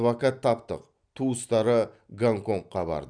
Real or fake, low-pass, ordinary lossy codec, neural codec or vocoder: fake; 9.9 kHz; none; vocoder, 44.1 kHz, 128 mel bands every 256 samples, BigVGAN v2